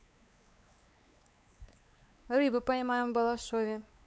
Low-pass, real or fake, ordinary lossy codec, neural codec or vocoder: none; fake; none; codec, 16 kHz, 4 kbps, X-Codec, WavLM features, trained on Multilingual LibriSpeech